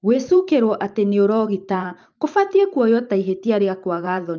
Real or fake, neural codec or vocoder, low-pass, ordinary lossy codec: fake; vocoder, 44.1 kHz, 128 mel bands every 512 samples, BigVGAN v2; 7.2 kHz; Opus, 24 kbps